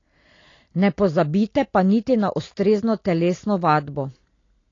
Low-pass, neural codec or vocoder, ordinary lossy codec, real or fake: 7.2 kHz; none; AAC, 32 kbps; real